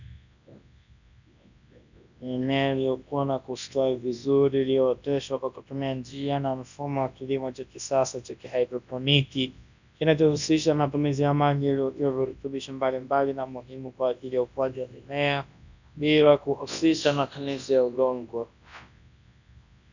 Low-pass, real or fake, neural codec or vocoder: 7.2 kHz; fake; codec, 24 kHz, 0.9 kbps, WavTokenizer, large speech release